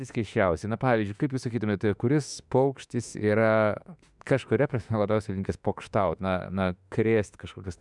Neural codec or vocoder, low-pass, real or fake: autoencoder, 48 kHz, 32 numbers a frame, DAC-VAE, trained on Japanese speech; 10.8 kHz; fake